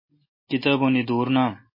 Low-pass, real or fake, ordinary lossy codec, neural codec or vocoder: 5.4 kHz; real; MP3, 24 kbps; none